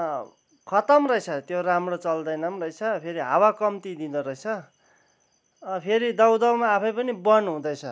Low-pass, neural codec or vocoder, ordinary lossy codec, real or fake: none; none; none; real